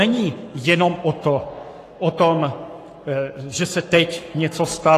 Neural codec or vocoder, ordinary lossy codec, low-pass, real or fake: codec, 44.1 kHz, 7.8 kbps, Pupu-Codec; AAC, 48 kbps; 14.4 kHz; fake